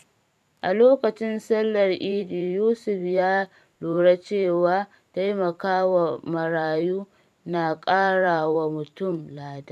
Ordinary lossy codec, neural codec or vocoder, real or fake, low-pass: none; vocoder, 44.1 kHz, 128 mel bands every 512 samples, BigVGAN v2; fake; 14.4 kHz